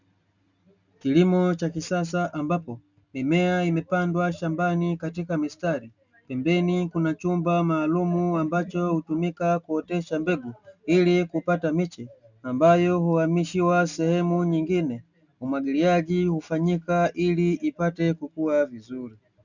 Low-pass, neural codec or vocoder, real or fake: 7.2 kHz; none; real